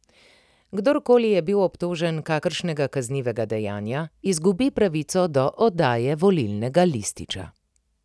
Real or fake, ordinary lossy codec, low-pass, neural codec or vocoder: real; none; none; none